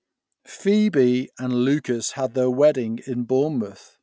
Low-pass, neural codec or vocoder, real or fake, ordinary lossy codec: none; none; real; none